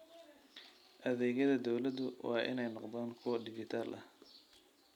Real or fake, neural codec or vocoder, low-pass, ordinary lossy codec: real; none; 19.8 kHz; none